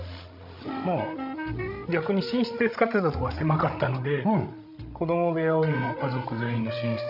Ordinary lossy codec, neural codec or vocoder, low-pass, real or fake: none; codec, 16 kHz, 16 kbps, FreqCodec, larger model; 5.4 kHz; fake